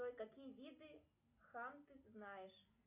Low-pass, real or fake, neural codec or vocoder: 3.6 kHz; real; none